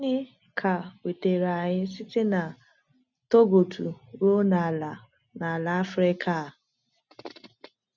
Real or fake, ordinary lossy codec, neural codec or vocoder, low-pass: real; none; none; 7.2 kHz